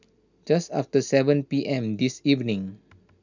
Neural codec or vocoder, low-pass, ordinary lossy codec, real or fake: none; 7.2 kHz; none; real